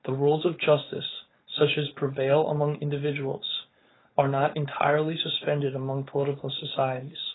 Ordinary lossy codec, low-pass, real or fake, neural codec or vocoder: AAC, 16 kbps; 7.2 kHz; fake; codec, 16 kHz, 4.8 kbps, FACodec